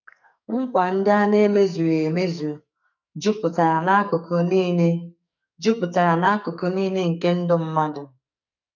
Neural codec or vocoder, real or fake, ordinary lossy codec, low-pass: codec, 44.1 kHz, 2.6 kbps, SNAC; fake; none; 7.2 kHz